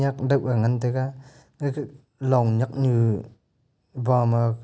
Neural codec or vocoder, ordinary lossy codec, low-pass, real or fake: none; none; none; real